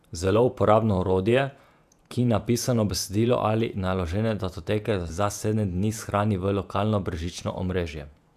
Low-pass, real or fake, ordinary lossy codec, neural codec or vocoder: 14.4 kHz; fake; none; vocoder, 48 kHz, 128 mel bands, Vocos